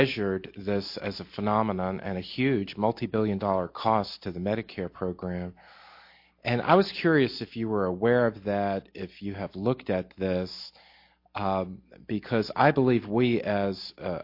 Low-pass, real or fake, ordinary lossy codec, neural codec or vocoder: 5.4 kHz; real; MP3, 32 kbps; none